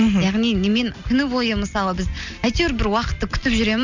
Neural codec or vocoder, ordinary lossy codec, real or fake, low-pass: none; none; real; 7.2 kHz